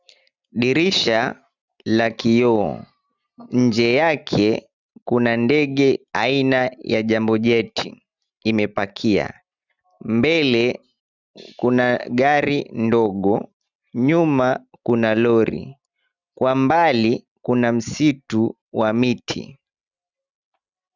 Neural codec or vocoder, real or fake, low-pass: none; real; 7.2 kHz